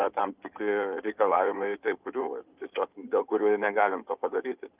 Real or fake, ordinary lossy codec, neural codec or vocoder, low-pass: fake; Opus, 32 kbps; codec, 16 kHz in and 24 kHz out, 2.2 kbps, FireRedTTS-2 codec; 3.6 kHz